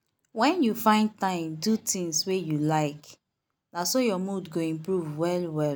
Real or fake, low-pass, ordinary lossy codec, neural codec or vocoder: real; none; none; none